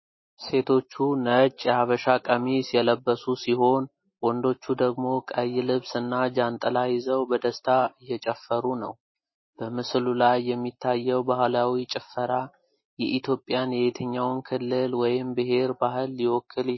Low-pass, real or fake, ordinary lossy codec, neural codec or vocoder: 7.2 kHz; real; MP3, 24 kbps; none